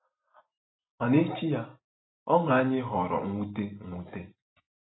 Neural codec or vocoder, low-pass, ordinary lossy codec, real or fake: none; 7.2 kHz; AAC, 16 kbps; real